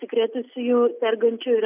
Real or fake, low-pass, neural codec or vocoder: real; 3.6 kHz; none